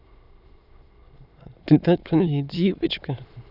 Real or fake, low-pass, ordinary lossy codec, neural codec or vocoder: fake; 5.4 kHz; Opus, 64 kbps; autoencoder, 22.05 kHz, a latent of 192 numbers a frame, VITS, trained on many speakers